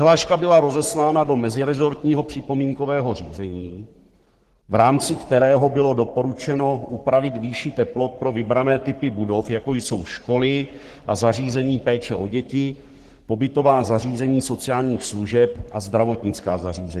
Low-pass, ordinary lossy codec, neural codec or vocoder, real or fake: 14.4 kHz; Opus, 16 kbps; codec, 44.1 kHz, 3.4 kbps, Pupu-Codec; fake